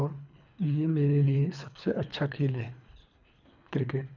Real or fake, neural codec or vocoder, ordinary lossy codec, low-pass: fake; codec, 24 kHz, 3 kbps, HILCodec; none; 7.2 kHz